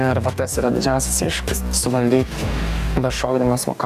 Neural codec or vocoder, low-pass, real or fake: codec, 44.1 kHz, 2.6 kbps, DAC; 14.4 kHz; fake